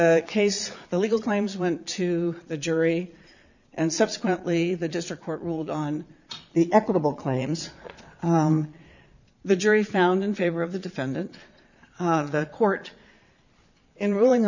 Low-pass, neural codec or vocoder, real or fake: 7.2 kHz; vocoder, 22.05 kHz, 80 mel bands, Vocos; fake